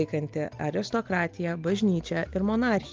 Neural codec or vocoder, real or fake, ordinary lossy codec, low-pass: none; real; Opus, 24 kbps; 7.2 kHz